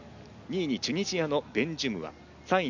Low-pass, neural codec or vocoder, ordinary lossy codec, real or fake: 7.2 kHz; none; MP3, 64 kbps; real